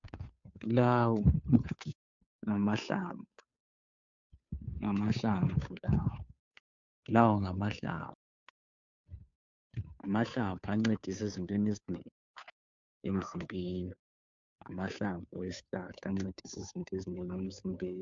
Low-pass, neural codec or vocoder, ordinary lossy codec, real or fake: 7.2 kHz; codec, 16 kHz, 2 kbps, FunCodec, trained on Chinese and English, 25 frames a second; MP3, 64 kbps; fake